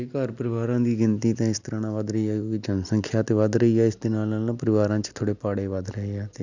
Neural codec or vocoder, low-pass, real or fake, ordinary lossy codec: none; 7.2 kHz; real; none